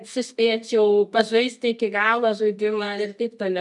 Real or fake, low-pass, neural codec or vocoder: fake; 10.8 kHz; codec, 24 kHz, 0.9 kbps, WavTokenizer, medium music audio release